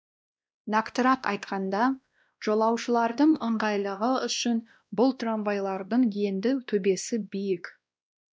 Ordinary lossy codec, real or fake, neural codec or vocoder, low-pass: none; fake; codec, 16 kHz, 1 kbps, X-Codec, WavLM features, trained on Multilingual LibriSpeech; none